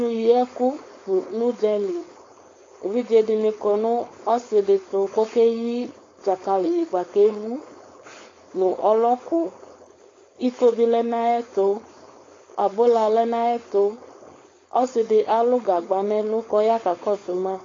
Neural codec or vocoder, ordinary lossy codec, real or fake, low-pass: codec, 16 kHz, 4.8 kbps, FACodec; AAC, 32 kbps; fake; 7.2 kHz